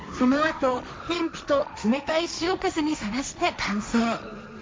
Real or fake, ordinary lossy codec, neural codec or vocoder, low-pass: fake; none; codec, 16 kHz, 1.1 kbps, Voila-Tokenizer; none